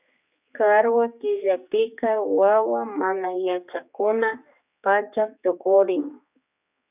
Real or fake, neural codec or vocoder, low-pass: fake; codec, 16 kHz, 2 kbps, X-Codec, HuBERT features, trained on general audio; 3.6 kHz